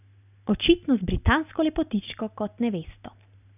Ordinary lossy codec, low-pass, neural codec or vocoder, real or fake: none; 3.6 kHz; none; real